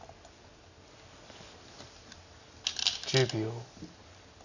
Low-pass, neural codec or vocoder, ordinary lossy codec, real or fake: 7.2 kHz; none; none; real